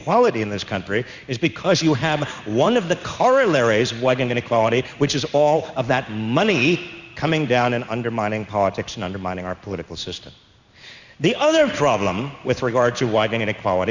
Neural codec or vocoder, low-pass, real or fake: codec, 16 kHz in and 24 kHz out, 1 kbps, XY-Tokenizer; 7.2 kHz; fake